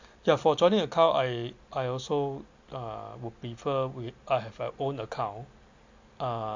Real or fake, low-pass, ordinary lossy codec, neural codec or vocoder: real; 7.2 kHz; MP3, 48 kbps; none